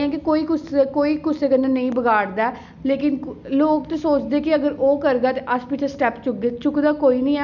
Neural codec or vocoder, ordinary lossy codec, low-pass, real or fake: none; none; 7.2 kHz; real